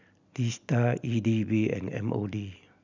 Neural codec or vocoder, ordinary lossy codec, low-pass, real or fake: none; none; 7.2 kHz; real